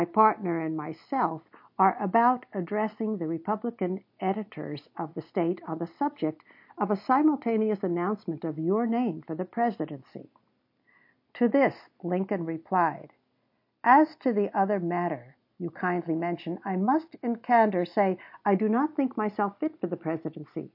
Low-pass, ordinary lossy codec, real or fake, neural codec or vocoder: 5.4 kHz; MP3, 32 kbps; real; none